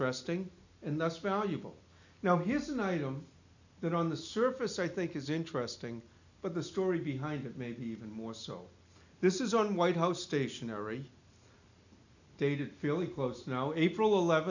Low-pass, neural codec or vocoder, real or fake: 7.2 kHz; none; real